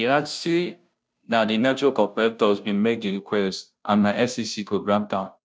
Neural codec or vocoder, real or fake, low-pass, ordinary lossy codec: codec, 16 kHz, 0.5 kbps, FunCodec, trained on Chinese and English, 25 frames a second; fake; none; none